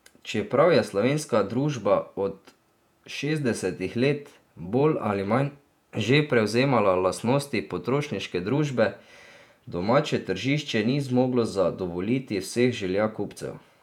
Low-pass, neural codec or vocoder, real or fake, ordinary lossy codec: 19.8 kHz; vocoder, 48 kHz, 128 mel bands, Vocos; fake; none